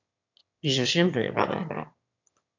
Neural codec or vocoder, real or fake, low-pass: autoencoder, 22.05 kHz, a latent of 192 numbers a frame, VITS, trained on one speaker; fake; 7.2 kHz